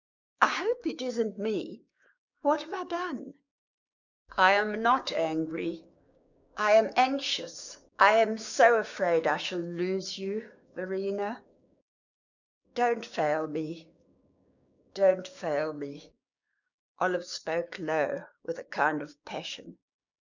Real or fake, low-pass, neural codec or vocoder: fake; 7.2 kHz; codec, 16 kHz, 6 kbps, DAC